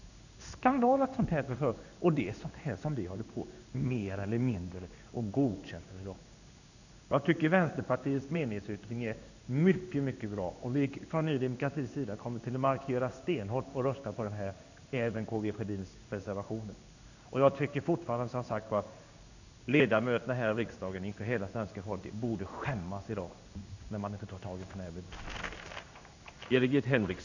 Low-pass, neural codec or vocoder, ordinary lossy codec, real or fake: 7.2 kHz; codec, 16 kHz in and 24 kHz out, 1 kbps, XY-Tokenizer; none; fake